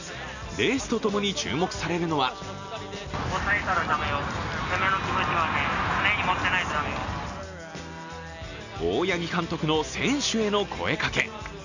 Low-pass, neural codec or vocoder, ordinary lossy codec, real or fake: 7.2 kHz; none; none; real